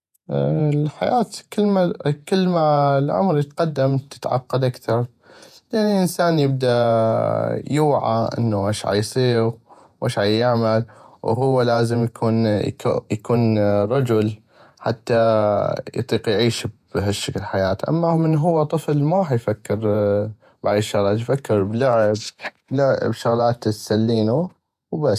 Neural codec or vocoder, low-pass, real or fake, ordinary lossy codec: vocoder, 48 kHz, 128 mel bands, Vocos; 14.4 kHz; fake; none